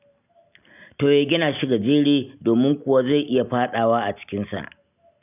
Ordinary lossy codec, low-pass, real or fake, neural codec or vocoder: none; 3.6 kHz; real; none